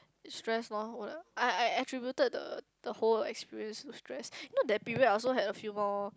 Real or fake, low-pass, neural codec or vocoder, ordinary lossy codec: real; none; none; none